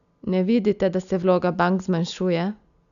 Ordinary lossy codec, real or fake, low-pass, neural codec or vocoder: none; real; 7.2 kHz; none